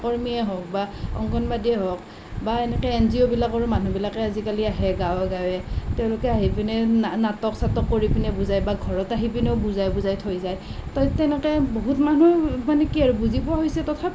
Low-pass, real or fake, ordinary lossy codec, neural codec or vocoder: none; real; none; none